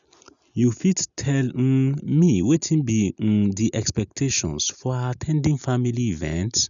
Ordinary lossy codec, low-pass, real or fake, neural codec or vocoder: none; 7.2 kHz; real; none